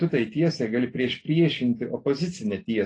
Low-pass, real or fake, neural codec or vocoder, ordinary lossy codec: 9.9 kHz; real; none; AAC, 32 kbps